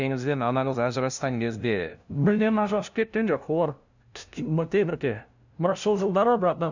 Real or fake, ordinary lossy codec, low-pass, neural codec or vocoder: fake; none; 7.2 kHz; codec, 16 kHz, 0.5 kbps, FunCodec, trained on LibriTTS, 25 frames a second